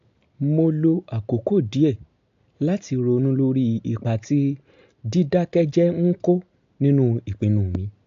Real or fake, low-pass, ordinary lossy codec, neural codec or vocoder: real; 7.2 kHz; AAC, 64 kbps; none